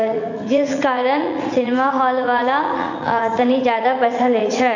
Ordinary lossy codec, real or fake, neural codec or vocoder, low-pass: none; fake; vocoder, 44.1 kHz, 80 mel bands, Vocos; 7.2 kHz